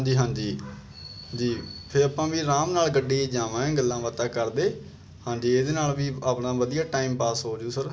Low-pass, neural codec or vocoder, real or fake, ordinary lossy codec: none; none; real; none